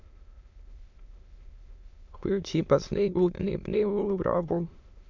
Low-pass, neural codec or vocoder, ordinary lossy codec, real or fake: 7.2 kHz; autoencoder, 22.05 kHz, a latent of 192 numbers a frame, VITS, trained on many speakers; MP3, 64 kbps; fake